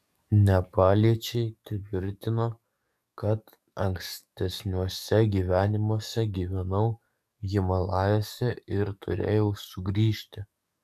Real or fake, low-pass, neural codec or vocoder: fake; 14.4 kHz; codec, 44.1 kHz, 7.8 kbps, DAC